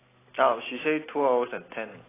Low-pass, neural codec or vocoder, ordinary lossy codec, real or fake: 3.6 kHz; none; AAC, 16 kbps; real